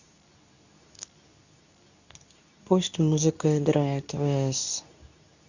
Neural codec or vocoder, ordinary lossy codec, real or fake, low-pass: codec, 24 kHz, 0.9 kbps, WavTokenizer, medium speech release version 2; none; fake; 7.2 kHz